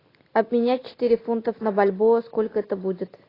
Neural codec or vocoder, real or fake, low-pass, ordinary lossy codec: none; real; 5.4 kHz; AAC, 24 kbps